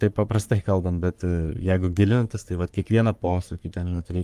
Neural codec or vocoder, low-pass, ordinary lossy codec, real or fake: codec, 44.1 kHz, 3.4 kbps, Pupu-Codec; 14.4 kHz; Opus, 32 kbps; fake